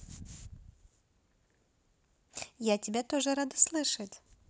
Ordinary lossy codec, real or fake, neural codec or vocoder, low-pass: none; real; none; none